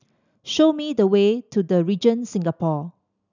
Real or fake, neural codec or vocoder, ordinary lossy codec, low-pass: real; none; none; 7.2 kHz